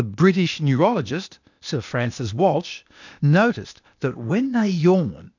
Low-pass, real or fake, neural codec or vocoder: 7.2 kHz; fake; codec, 16 kHz, 0.8 kbps, ZipCodec